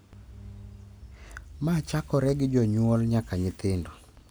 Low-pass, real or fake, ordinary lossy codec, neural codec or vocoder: none; real; none; none